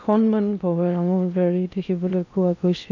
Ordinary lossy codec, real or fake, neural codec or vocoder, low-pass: none; fake; codec, 16 kHz in and 24 kHz out, 0.8 kbps, FocalCodec, streaming, 65536 codes; 7.2 kHz